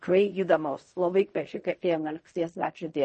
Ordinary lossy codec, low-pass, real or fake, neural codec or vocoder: MP3, 32 kbps; 10.8 kHz; fake; codec, 16 kHz in and 24 kHz out, 0.4 kbps, LongCat-Audio-Codec, fine tuned four codebook decoder